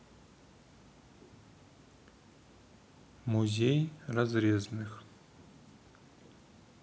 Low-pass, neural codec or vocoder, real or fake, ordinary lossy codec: none; none; real; none